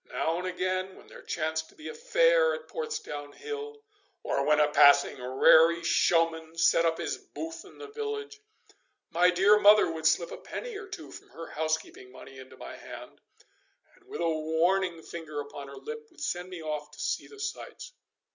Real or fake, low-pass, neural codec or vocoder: real; 7.2 kHz; none